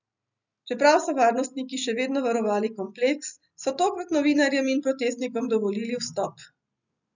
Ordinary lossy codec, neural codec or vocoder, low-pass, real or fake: none; none; 7.2 kHz; real